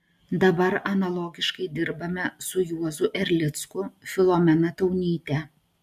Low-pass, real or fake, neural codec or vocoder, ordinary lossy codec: 14.4 kHz; real; none; MP3, 96 kbps